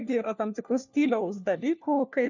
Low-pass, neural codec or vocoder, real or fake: 7.2 kHz; codec, 16 kHz, 1 kbps, FunCodec, trained on LibriTTS, 50 frames a second; fake